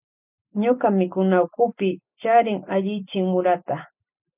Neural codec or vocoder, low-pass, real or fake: none; 3.6 kHz; real